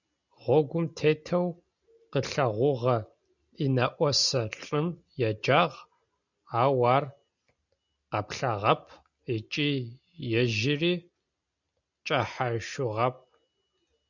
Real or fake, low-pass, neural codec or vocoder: real; 7.2 kHz; none